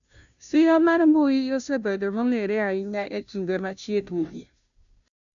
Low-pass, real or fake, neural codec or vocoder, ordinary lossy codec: 7.2 kHz; fake; codec, 16 kHz, 0.5 kbps, FunCodec, trained on Chinese and English, 25 frames a second; MP3, 96 kbps